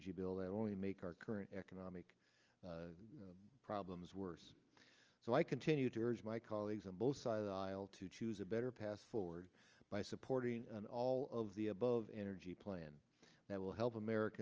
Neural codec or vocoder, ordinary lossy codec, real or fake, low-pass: none; Opus, 24 kbps; real; 7.2 kHz